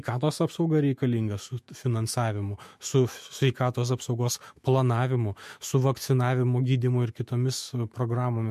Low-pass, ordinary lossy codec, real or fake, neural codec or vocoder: 14.4 kHz; MP3, 64 kbps; fake; autoencoder, 48 kHz, 128 numbers a frame, DAC-VAE, trained on Japanese speech